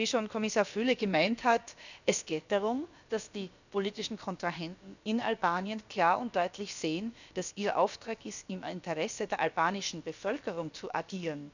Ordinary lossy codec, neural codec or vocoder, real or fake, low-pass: none; codec, 16 kHz, about 1 kbps, DyCAST, with the encoder's durations; fake; 7.2 kHz